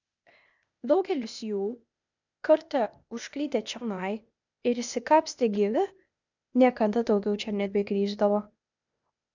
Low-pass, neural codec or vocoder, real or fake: 7.2 kHz; codec, 16 kHz, 0.8 kbps, ZipCodec; fake